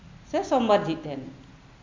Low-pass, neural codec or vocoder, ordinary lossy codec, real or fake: 7.2 kHz; none; MP3, 48 kbps; real